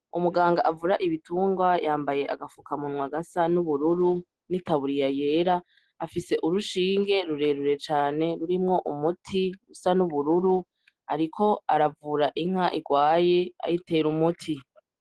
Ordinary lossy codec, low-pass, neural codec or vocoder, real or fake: Opus, 16 kbps; 10.8 kHz; none; real